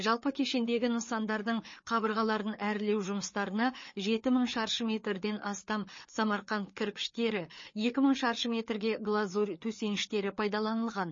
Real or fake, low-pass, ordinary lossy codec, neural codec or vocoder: fake; 7.2 kHz; MP3, 32 kbps; codec, 16 kHz, 4 kbps, FreqCodec, larger model